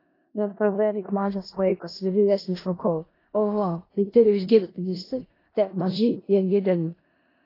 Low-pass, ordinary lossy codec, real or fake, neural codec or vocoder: 5.4 kHz; AAC, 24 kbps; fake; codec, 16 kHz in and 24 kHz out, 0.4 kbps, LongCat-Audio-Codec, four codebook decoder